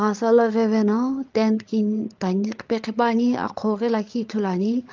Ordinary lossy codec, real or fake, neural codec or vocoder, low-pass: Opus, 32 kbps; fake; codec, 16 kHz, 8 kbps, FreqCodec, larger model; 7.2 kHz